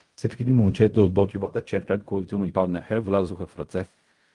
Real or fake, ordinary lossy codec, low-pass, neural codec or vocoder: fake; Opus, 32 kbps; 10.8 kHz; codec, 16 kHz in and 24 kHz out, 0.4 kbps, LongCat-Audio-Codec, fine tuned four codebook decoder